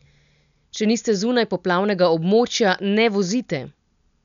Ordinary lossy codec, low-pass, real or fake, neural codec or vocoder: none; 7.2 kHz; real; none